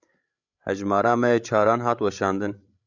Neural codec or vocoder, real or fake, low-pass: codec, 16 kHz, 16 kbps, FreqCodec, larger model; fake; 7.2 kHz